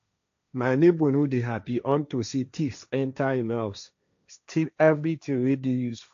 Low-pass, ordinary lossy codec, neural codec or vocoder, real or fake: 7.2 kHz; AAC, 64 kbps; codec, 16 kHz, 1.1 kbps, Voila-Tokenizer; fake